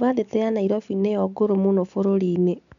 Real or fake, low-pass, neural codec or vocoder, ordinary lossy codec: real; 7.2 kHz; none; none